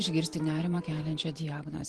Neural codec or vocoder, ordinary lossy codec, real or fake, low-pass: vocoder, 44.1 kHz, 128 mel bands every 512 samples, BigVGAN v2; Opus, 16 kbps; fake; 10.8 kHz